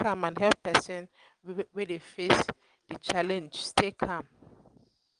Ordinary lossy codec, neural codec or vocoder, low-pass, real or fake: Opus, 32 kbps; vocoder, 22.05 kHz, 80 mel bands, WaveNeXt; 9.9 kHz; fake